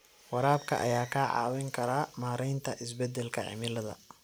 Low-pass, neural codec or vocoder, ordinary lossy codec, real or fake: none; none; none; real